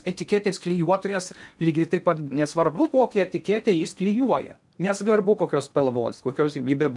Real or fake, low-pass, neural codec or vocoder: fake; 10.8 kHz; codec, 16 kHz in and 24 kHz out, 0.8 kbps, FocalCodec, streaming, 65536 codes